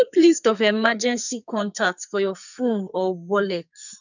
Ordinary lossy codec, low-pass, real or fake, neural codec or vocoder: none; 7.2 kHz; fake; codec, 44.1 kHz, 2.6 kbps, SNAC